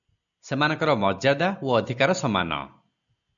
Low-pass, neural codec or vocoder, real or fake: 7.2 kHz; none; real